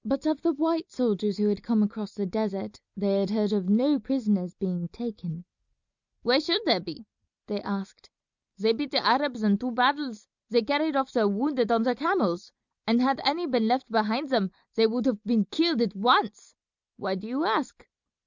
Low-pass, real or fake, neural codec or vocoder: 7.2 kHz; real; none